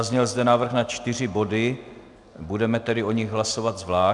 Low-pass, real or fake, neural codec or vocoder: 10.8 kHz; real; none